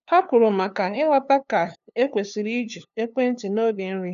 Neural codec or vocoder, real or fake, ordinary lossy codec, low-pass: codec, 16 kHz, 2 kbps, FunCodec, trained on LibriTTS, 25 frames a second; fake; none; 7.2 kHz